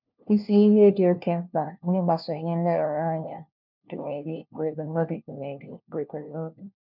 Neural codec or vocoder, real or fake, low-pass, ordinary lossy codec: codec, 16 kHz, 1 kbps, FunCodec, trained on LibriTTS, 50 frames a second; fake; 5.4 kHz; none